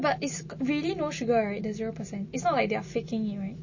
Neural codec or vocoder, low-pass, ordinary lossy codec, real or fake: none; 7.2 kHz; MP3, 32 kbps; real